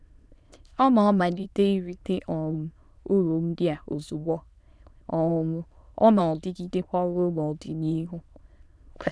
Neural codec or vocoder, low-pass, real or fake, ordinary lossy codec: autoencoder, 22.05 kHz, a latent of 192 numbers a frame, VITS, trained on many speakers; none; fake; none